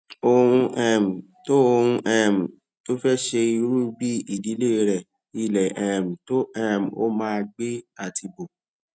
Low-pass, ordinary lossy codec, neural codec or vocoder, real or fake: none; none; none; real